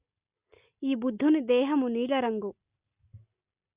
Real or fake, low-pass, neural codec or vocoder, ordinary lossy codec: real; 3.6 kHz; none; Opus, 64 kbps